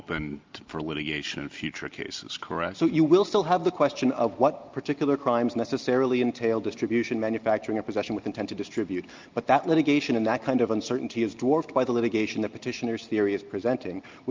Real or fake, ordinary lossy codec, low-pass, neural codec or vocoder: real; Opus, 24 kbps; 7.2 kHz; none